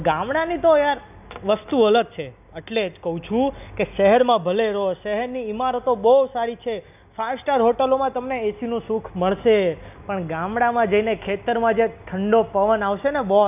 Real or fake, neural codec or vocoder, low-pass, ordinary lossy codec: real; none; 3.6 kHz; none